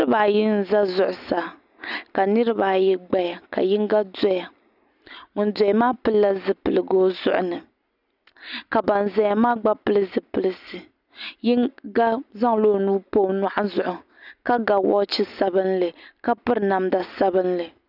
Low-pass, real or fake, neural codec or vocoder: 5.4 kHz; real; none